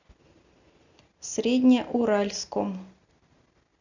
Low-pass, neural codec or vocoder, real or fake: 7.2 kHz; none; real